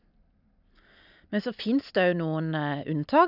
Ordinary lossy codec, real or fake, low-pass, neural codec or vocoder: none; real; 5.4 kHz; none